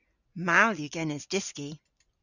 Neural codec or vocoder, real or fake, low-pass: none; real; 7.2 kHz